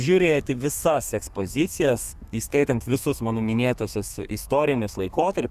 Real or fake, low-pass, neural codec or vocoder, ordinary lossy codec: fake; 14.4 kHz; codec, 32 kHz, 1.9 kbps, SNAC; Opus, 64 kbps